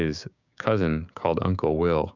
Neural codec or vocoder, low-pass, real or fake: codec, 16 kHz, 6 kbps, DAC; 7.2 kHz; fake